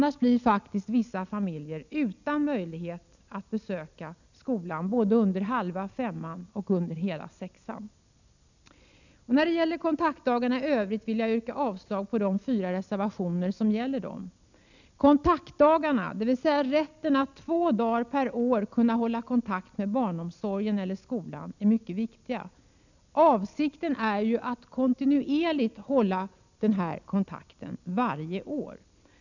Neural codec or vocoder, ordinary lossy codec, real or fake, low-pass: none; none; real; 7.2 kHz